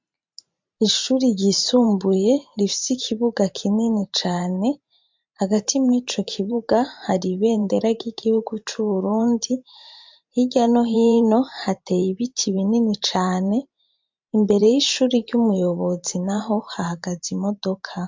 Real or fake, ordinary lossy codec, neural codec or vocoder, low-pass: fake; MP3, 64 kbps; vocoder, 44.1 kHz, 128 mel bands every 512 samples, BigVGAN v2; 7.2 kHz